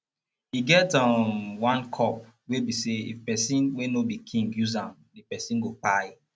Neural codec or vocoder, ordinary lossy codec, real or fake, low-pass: none; none; real; none